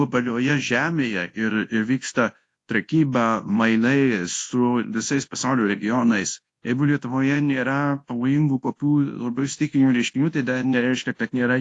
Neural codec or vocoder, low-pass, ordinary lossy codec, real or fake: codec, 24 kHz, 0.9 kbps, WavTokenizer, large speech release; 10.8 kHz; AAC, 48 kbps; fake